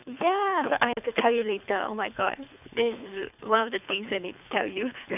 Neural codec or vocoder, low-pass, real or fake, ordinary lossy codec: codec, 24 kHz, 3 kbps, HILCodec; 3.6 kHz; fake; none